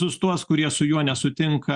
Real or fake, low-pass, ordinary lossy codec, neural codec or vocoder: real; 10.8 kHz; Opus, 64 kbps; none